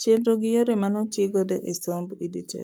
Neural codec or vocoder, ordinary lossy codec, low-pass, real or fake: codec, 44.1 kHz, 7.8 kbps, Pupu-Codec; none; none; fake